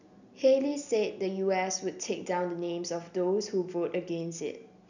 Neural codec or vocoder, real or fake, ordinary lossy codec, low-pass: none; real; none; 7.2 kHz